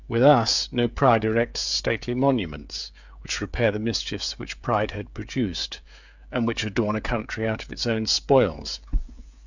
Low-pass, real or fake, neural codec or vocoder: 7.2 kHz; fake; codec, 16 kHz, 16 kbps, FreqCodec, smaller model